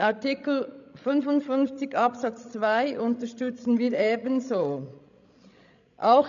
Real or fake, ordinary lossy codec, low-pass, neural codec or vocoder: fake; none; 7.2 kHz; codec, 16 kHz, 16 kbps, FreqCodec, larger model